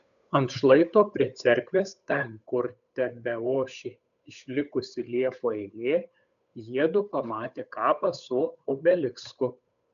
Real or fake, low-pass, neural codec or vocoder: fake; 7.2 kHz; codec, 16 kHz, 8 kbps, FunCodec, trained on Chinese and English, 25 frames a second